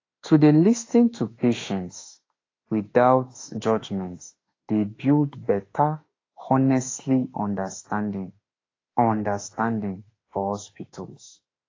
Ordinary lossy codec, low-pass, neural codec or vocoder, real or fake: AAC, 32 kbps; 7.2 kHz; autoencoder, 48 kHz, 32 numbers a frame, DAC-VAE, trained on Japanese speech; fake